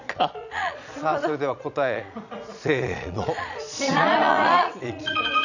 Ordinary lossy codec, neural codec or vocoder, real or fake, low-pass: none; vocoder, 22.05 kHz, 80 mel bands, Vocos; fake; 7.2 kHz